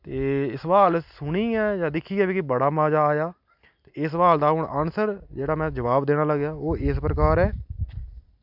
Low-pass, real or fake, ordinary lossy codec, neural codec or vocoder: 5.4 kHz; real; none; none